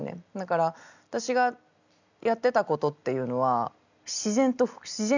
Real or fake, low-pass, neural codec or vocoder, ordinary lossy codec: real; 7.2 kHz; none; none